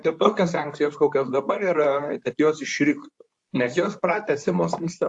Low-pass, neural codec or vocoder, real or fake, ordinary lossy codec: 10.8 kHz; codec, 24 kHz, 0.9 kbps, WavTokenizer, medium speech release version 2; fake; AAC, 64 kbps